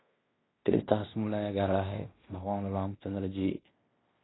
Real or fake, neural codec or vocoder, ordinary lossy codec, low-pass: fake; codec, 16 kHz in and 24 kHz out, 0.9 kbps, LongCat-Audio-Codec, fine tuned four codebook decoder; AAC, 16 kbps; 7.2 kHz